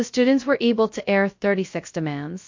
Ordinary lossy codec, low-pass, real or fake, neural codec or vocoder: MP3, 48 kbps; 7.2 kHz; fake; codec, 16 kHz, 0.2 kbps, FocalCodec